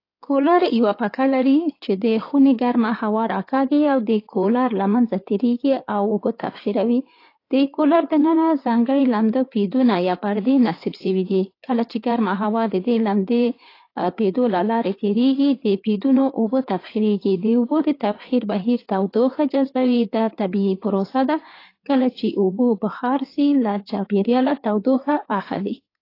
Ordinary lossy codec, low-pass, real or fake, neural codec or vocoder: AAC, 32 kbps; 5.4 kHz; fake; codec, 16 kHz in and 24 kHz out, 2.2 kbps, FireRedTTS-2 codec